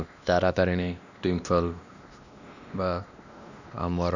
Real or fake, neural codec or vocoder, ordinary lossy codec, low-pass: fake; codec, 16 kHz, 1 kbps, X-Codec, WavLM features, trained on Multilingual LibriSpeech; none; 7.2 kHz